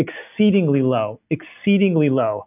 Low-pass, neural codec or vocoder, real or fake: 3.6 kHz; none; real